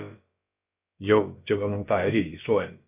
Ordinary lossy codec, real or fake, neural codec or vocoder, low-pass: AAC, 32 kbps; fake; codec, 16 kHz, about 1 kbps, DyCAST, with the encoder's durations; 3.6 kHz